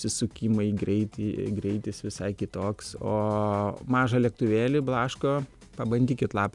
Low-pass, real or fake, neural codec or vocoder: 10.8 kHz; real; none